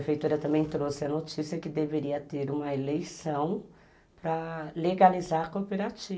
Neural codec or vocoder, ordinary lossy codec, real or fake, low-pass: none; none; real; none